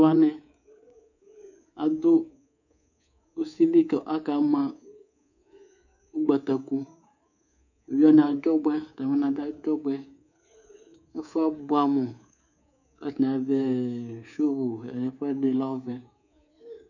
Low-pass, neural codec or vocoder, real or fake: 7.2 kHz; codec, 24 kHz, 3.1 kbps, DualCodec; fake